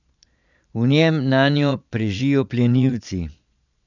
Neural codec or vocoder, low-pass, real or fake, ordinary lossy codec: vocoder, 22.05 kHz, 80 mel bands, Vocos; 7.2 kHz; fake; none